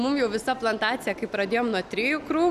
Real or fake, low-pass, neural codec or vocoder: real; 14.4 kHz; none